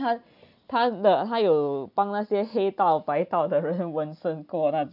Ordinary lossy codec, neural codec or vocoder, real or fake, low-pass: none; none; real; 5.4 kHz